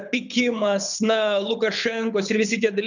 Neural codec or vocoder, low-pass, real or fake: codec, 24 kHz, 6 kbps, HILCodec; 7.2 kHz; fake